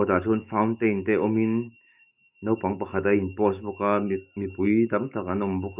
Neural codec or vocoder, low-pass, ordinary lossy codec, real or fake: autoencoder, 48 kHz, 128 numbers a frame, DAC-VAE, trained on Japanese speech; 3.6 kHz; none; fake